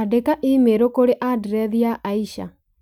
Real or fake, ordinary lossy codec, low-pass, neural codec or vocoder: real; none; 19.8 kHz; none